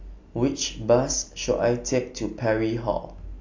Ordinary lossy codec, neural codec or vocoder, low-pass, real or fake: AAC, 48 kbps; none; 7.2 kHz; real